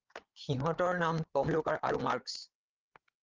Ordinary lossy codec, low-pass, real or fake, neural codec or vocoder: Opus, 24 kbps; 7.2 kHz; fake; codec, 16 kHz, 8 kbps, FreqCodec, larger model